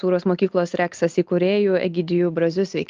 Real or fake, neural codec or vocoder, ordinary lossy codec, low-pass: real; none; Opus, 32 kbps; 7.2 kHz